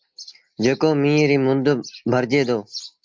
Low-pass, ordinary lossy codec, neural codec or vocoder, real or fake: 7.2 kHz; Opus, 32 kbps; none; real